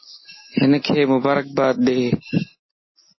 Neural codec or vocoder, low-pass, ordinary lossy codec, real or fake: none; 7.2 kHz; MP3, 24 kbps; real